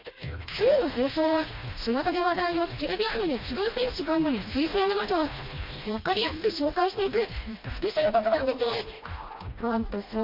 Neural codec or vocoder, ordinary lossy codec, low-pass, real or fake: codec, 16 kHz, 1 kbps, FreqCodec, smaller model; MP3, 32 kbps; 5.4 kHz; fake